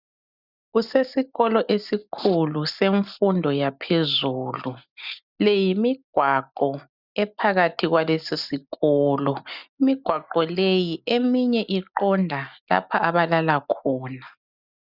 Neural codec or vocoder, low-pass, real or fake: none; 5.4 kHz; real